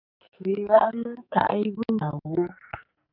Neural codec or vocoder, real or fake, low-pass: codec, 44.1 kHz, 2.6 kbps, SNAC; fake; 5.4 kHz